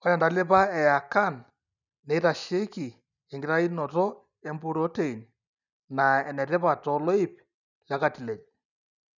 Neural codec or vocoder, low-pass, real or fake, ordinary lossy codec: none; 7.2 kHz; real; none